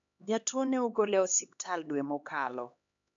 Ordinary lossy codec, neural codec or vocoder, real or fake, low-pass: AAC, 64 kbps; codec, 16 kHz, 2 kbps, X-Codec, HuBERT features, trained on LibriSpeech; fake; 7.2 kHz